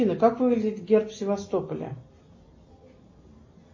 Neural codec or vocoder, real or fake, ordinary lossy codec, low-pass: none; real; MP3, 32 kbps; 7.2 kHz